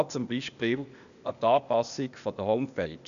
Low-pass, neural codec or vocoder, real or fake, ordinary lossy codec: 7.2 kHz; codec, 16 kHz, 0.8 kbps, ZipCodec; fake; none